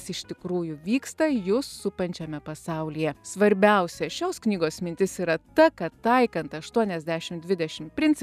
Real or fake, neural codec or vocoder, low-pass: real; none; 14.4 kHz